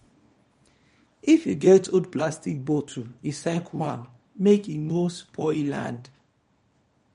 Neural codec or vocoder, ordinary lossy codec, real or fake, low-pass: codec, 24 kHz, 0.9 kbps, WavTokenizer, small release; MP3, 48 kbps; fake; 10.8 kHz